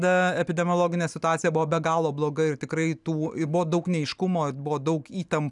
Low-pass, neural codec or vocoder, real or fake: 10.8 kHz; none; real